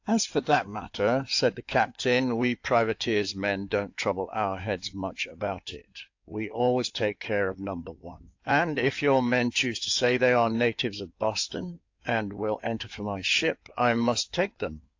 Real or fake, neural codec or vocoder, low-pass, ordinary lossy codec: fake; codec, 16 kHz in and 24 kHz out, 2.2 kbps, FireRedTTS-2 codec; 7.2 kHz; AAC, 48 kbps